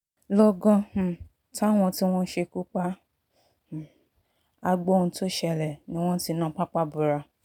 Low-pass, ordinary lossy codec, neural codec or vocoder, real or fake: 19.8 kHz; none; none; real